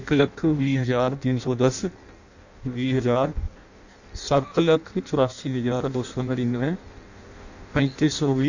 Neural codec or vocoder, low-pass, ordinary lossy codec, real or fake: codec, 16 kHz in and 24 kHz out, 0.6 kbps, FireRedTTS-2 codec; 7.2 kHz; none; fake